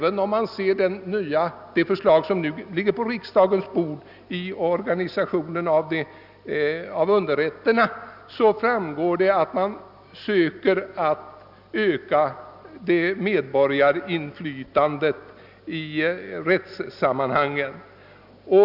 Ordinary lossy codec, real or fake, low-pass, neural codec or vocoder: none; real; 5.4 kHz; none